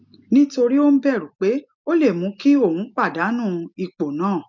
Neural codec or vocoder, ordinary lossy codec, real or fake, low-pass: none; MP3, 64 kbps; real; 7.2 kHz